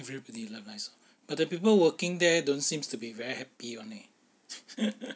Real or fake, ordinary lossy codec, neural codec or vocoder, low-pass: real; none; none; none